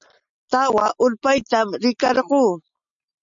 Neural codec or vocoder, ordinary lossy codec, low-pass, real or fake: none; MP3, 64 kbps; 7.2 kHz; real